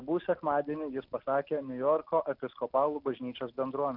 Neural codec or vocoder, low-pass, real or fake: none; 5.4 kHz; real